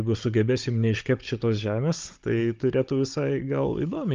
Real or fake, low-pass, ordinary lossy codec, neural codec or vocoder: fake; 7.2 kHz; Opus, 32 kbps; codec, 16 kHz, 16 kbps, FunCodec, trained on Chinese and English, 50 frames a second